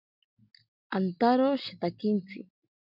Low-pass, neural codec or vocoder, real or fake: 5.4 kHz; none; real